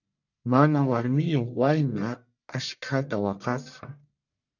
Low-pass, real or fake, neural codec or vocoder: 7.2 kHz; fake; codec, 44.1 kHz, 1.7 kbps, Pupu-Codec